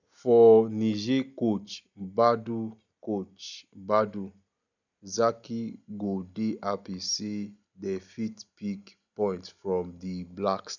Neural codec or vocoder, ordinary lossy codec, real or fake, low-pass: none; none; real; 7.2 kHz